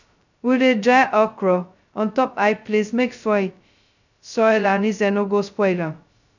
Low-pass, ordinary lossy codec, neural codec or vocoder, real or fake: 7.2 kHz; none; codec, 16 kHz, 0.2 kbps, FocalCodec; fake